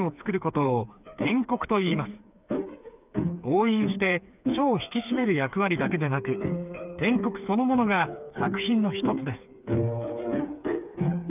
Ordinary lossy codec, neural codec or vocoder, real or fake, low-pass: none; codec, 16 kHz, 4 kbps, FreqCodec, smaller model; fake; 3.6 kHz